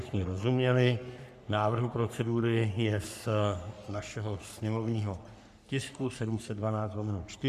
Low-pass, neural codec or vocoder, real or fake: 14.4 kHz; codec, 44.1 kHz, 3.4 kbps, Pupu-Codec; fake